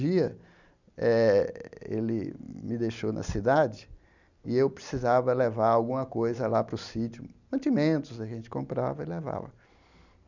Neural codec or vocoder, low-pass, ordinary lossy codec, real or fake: none; 7.2 kHz; none; real